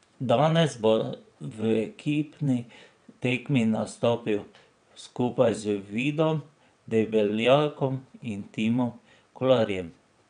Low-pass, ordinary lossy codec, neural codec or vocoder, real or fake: 9.9 kHz; none; vocoder, 22.05 kHz, 80 mel bands, WaveNeXt; fake